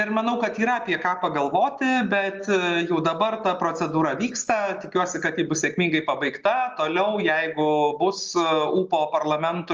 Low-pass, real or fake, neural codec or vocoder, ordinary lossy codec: 7.2 kHz; real; none; Opus, 32 kbps